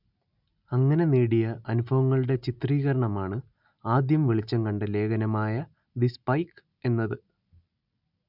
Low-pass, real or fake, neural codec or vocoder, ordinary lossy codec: 5.4 kHz; real; none; none